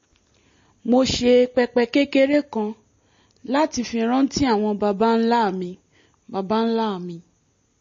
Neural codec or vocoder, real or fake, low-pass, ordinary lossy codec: none; real; 7.2 kHz; MP3, 32 kbps